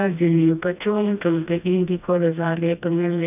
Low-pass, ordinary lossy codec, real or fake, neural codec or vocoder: 3.6 kHz; none; fake; codec, 16 kHz, 1 kbps, FreqCodec, smaller model